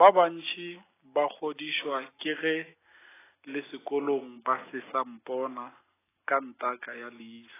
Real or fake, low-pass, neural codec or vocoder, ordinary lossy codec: real; 3.6 kHz; none; AAC, 16 kbps